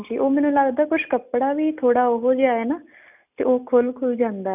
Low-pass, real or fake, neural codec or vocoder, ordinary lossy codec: 3.6 kHz; real; none; none